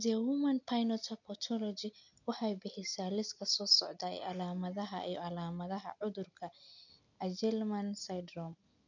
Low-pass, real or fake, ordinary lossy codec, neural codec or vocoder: 7.2 kHz; real; none; none